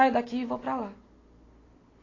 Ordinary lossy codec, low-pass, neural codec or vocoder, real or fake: AAC, 32 kbps; 7.2 kHz; none; real